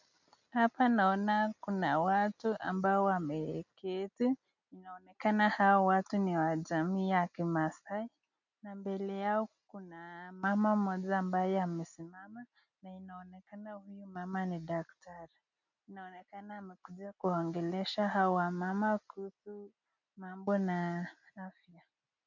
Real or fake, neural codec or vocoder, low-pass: real; none; 7.2 kHz